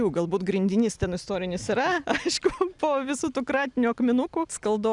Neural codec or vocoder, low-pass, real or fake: none; 10.8 kHz; real